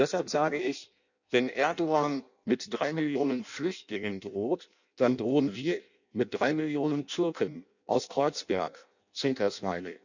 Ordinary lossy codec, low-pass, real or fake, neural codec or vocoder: none; 7.2 kHz; fake; codec, 16 kHz in and 24 kHz out, 0.6 kbps, FireRedTTS-2 codec